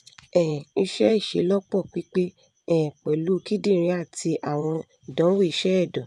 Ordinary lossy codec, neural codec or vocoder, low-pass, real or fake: none; vocoder, 24 kHz, 100 mel bands, Vocos; none; fake